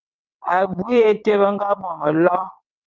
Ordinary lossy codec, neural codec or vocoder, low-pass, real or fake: Opus, 24 kbps; vocoder, 22.05 kHz, 80 mel bands, WaveNeXt; 7.2 kHz; fake